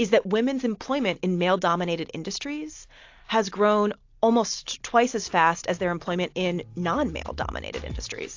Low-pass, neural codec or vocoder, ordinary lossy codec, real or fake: 7.2 kHz; none; AAC, 48 kbps; real